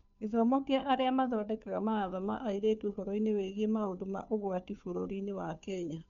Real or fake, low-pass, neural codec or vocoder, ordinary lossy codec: fake; 7.2 kHz; codec, 16 kHz, 2 kbps, FunCodec, trained on Chinese and English, 25 frames a second; none